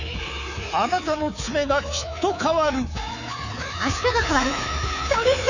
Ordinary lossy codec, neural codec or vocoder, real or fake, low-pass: none; codec, 24 kHz, 3.1 kbps, DualCodec; fake; 7.2 kHz